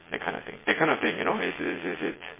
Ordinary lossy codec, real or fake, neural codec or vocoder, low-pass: MP3, 16 kbps; fake; vocoder, 22.05 kHz, 80 mel bands, Vocos; 3.6 kHz